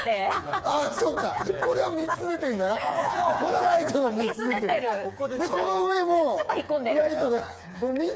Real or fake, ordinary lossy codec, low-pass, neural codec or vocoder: fake; none; none; codec, 16 kHz, 4 kbps, FreqCodec, smaller model